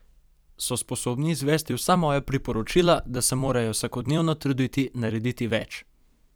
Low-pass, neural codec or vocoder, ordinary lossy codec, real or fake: none; vocoder, 44.1 kHz, 128 mel bands every 256 samples, BigVGAN v2; none; fake